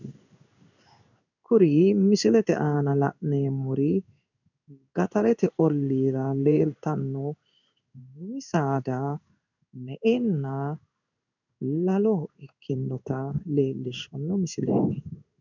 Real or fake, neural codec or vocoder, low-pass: fake; codec, 16 kHz in and 24 kHz out, 1 kbps, XY-Tokenizer; 7.2 kHz